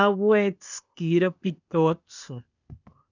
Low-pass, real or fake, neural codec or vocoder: 7.2 kHz; fake; codec, 16 kHz, 0.8 kbps, ZipCodec